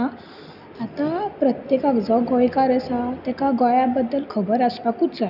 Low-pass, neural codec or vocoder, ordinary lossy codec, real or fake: 5.4 kHz; none; none; real